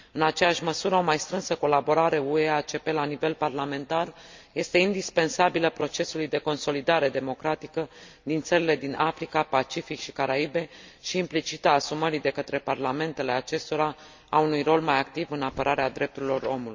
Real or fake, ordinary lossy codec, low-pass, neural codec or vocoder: real; MP3, 64 kbps; 7.2 kHz; none